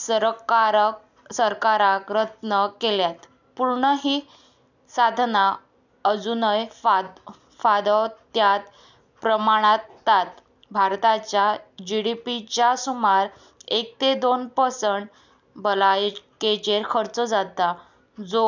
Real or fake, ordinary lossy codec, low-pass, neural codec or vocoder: real; none; 7.2 kHz; none